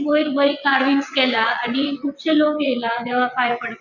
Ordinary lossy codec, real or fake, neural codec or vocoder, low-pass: none; fake; vocoder, 22.05 kHz, 80 mel bands, WaveNeXt; 7.2 kHz